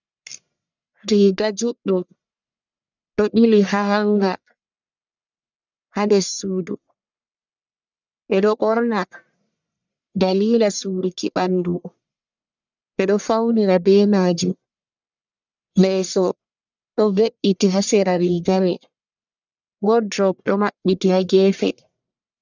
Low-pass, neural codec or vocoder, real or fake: 7.2 kHz; codec, 44.1 kHz, 1.7 kbps, Pupu-Codec; fake